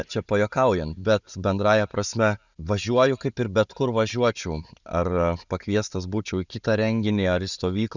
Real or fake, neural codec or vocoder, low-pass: real; none; 7.2 kHz